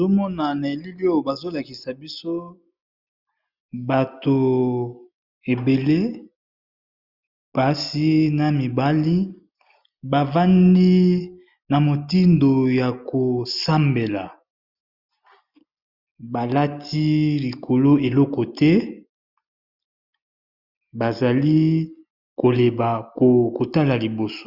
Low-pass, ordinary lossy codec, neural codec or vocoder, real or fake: 5.4 kHz; Opus, 64 kbps; none; real